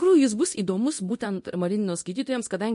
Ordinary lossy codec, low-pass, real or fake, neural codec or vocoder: MP3, 48 kbps; 10.8 kHz; fake; codec, 24 kHz, 0.9 kbps, DualCodec